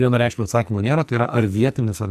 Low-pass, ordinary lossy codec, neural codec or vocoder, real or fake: 14.4 kHz; MP3, 96 kbps; codec, 44.1 kHz, 2.6 kbps, SNAC; fake